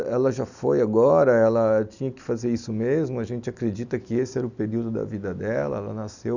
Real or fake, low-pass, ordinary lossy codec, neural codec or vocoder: real; 7.2 kHz; none; none